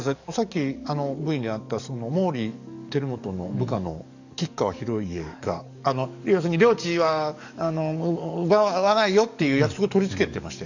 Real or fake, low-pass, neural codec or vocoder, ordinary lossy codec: fake; 7.2 kHz; codec, 44.1 kHz, 7.8 kbps, DAC; none